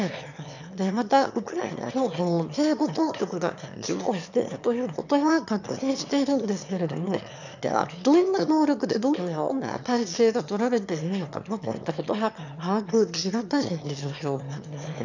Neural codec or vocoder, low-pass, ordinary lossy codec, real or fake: autoencoder, 22.05 kHz, a latent of 192 numbers a frame, VITS, trained on one speaker; 7.2 kHz; none; fake